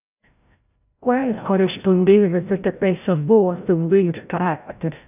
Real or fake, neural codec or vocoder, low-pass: fake; codec, 16 kHz, 0.5 kbps, FreqCodec, larger model; 3.6 kHz